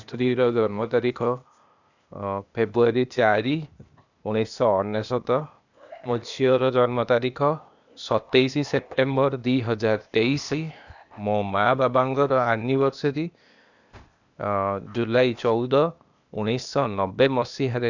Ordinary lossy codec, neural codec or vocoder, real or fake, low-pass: none; codec, 16 kHz, 0.8 kbps, ZipCodec; fake; 7.2 kHz